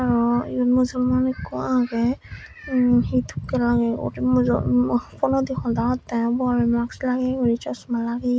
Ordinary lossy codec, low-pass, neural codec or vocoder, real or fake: none; none; none; real